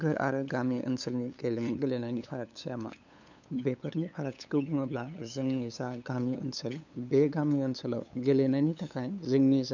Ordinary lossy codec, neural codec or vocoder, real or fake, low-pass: none; codec, 16 kHz, 8 kbps, FunCodec, trained on LibriTTS, 25 frames a second; fake; 7.2 kHz